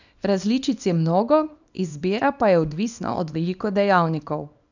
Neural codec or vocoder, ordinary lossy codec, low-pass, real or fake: codec, 24 kHz, 0.9 kbps, WavTokenizer, small release; none; 7.2 kHz; fake